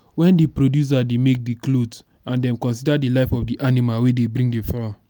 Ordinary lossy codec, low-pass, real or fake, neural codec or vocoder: none; none; real; none